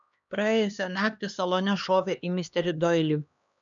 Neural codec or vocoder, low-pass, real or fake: codec, 16 kHz, 2 kbps, X-Codec, HuBERT features, trained on LibriSpeech; 7.2 kHz; fake